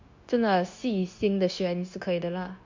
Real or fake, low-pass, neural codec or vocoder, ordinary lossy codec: fake; 7.2 kHz; codec, 16 kHz, 0.9 kbps, LongCat-Audio-Codec; MP3, 48 kbps